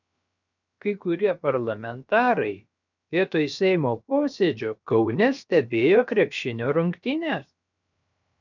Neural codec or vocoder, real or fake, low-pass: codec, 16 kHz, 0.7 kbps, FocalCodec; fake; 7.2 kHz